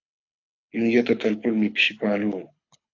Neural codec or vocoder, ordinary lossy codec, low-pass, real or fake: codec, 24 kHz, 6 kbps, HILCodec; AAC, 48 kbps; 7.2 kHz; fake